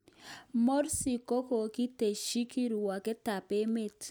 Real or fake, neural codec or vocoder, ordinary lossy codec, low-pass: real; none; none; none